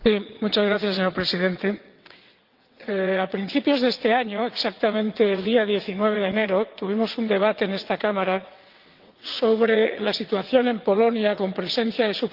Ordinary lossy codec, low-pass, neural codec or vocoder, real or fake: Opus, 32 kbps; 5.4 kHz; vocoder, 22.05 kHz, 80 mel bands, WaveNeXt; fake